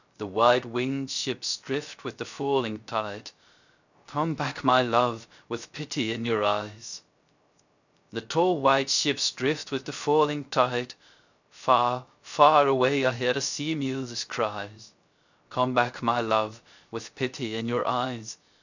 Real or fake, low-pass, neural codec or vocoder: fake; 7.2 kHz; codec, 16 kHz, 0.3 kbps, FocalCodec